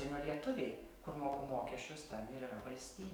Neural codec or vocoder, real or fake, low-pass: codec, 44.1 kHz, 7.8 kbps, DAC; fake; 19.8 kHz